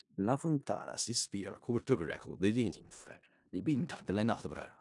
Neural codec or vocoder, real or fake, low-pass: codec, 16 kHz in and 24 kHz out, 0.4 kbps, LongCat-Audio-Codec, four codebook decoder; fake; 10.8 kHz